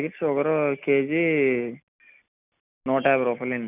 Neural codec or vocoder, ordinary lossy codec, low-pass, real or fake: none; none; 3.6 kHz; real